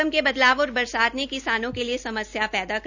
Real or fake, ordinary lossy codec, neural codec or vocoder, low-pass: real; none; none; 7.2 kHz